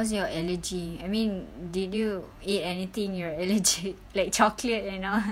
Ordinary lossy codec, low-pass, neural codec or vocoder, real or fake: none; 19.8 kHz; vocoder, 48 kHz, 128 mel bands, Vocos; fake